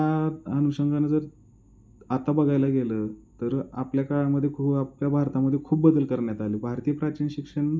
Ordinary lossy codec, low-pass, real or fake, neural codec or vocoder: none; none; real; none